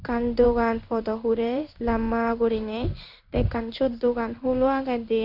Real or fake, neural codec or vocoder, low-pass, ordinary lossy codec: fake; codec, 16 kHz in and 24 kHz out, 1 kbps, XY-Tokenizer; 5.4 kHz; none